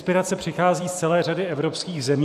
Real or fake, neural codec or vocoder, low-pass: fake; vocoder, 44.1 kHz, 128 mel bands every 512 samples, BigVGAN v2; 14.4 kHz